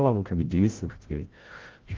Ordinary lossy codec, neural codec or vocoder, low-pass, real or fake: Opus, 16 kbps; codec, 16 kHz, 0.5 kbps, FreqCodec, larger model; 7.2 kHz; fake